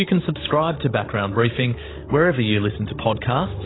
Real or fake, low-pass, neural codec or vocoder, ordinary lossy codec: fake; 7.2 kHz; codec, 16 kHz, 16 kbps, FreqCodec, larger model; AAC, 16 kbps